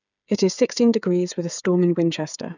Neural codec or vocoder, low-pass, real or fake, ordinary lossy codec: codec, 16 kHz, 8 kbps, FreqCodec, smaller model; 7.2 kHz; fake; none